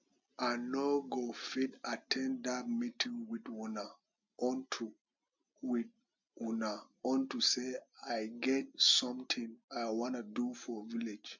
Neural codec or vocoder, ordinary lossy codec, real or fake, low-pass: none; MP3, 64 kbps; real; 7.2 kHz